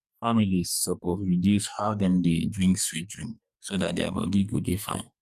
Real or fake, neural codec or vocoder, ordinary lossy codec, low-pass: fake; codec, 44.1 kHz, 2.6 kbps, SNAC; Opus, 64 kbps; 14.4 kHz